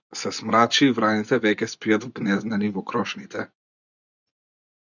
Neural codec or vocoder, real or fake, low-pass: vocoder, 24 kHz, 100 mel bands, Vocos; fake; 7.2 kHz